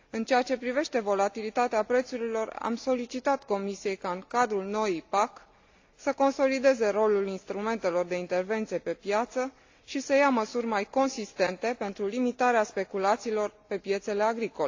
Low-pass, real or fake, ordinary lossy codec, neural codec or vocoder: 7.2 kHz; real; AAC, 48 kbps; none